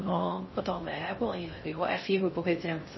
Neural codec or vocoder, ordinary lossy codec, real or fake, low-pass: codec, 16 kHz in and 24 kHz out, 0.6 kbps, FocalCodec, streaming, 4096 codes; MP3, 24 kbps; fake; 7.2 kHz